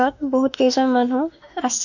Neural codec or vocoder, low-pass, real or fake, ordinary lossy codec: autoencoder, 48 kHz, 32 numbers a frame, DAC-VAE, trained on Japanese speech; 7.2 kHz; fake; none